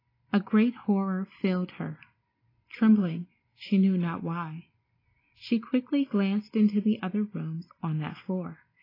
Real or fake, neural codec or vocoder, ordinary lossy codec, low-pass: real; none; AAC, 24 kbps; 5.4 kHz